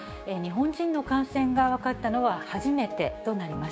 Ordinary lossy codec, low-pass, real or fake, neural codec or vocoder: none; none; fake; codec, 16 kHz, 6 kbps, DAC